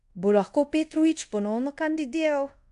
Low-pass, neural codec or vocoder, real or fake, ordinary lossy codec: 10.8 kHz; codec, 24 kHz, 0.5 kbps, DualCodec; fake; AAC, 64 kbps